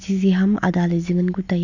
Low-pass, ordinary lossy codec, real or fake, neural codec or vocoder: 7.2 kHz; none; real; none